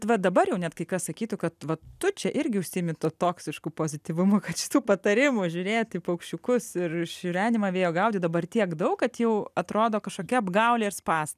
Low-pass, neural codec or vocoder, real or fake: 14.4 kHz; none; real